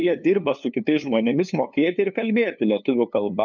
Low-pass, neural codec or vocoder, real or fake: 7.2 kHz; codec, 16 kHz, 2 kbps, FunCodec, trained on LibriTTS, 25 frames a second; fake